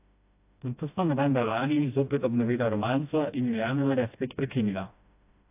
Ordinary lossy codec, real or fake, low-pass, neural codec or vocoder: AAC, 32 kbps; fake; 3.6 kHz; codec, 16 kHz, 1 kbps, FreqCodec, smaller model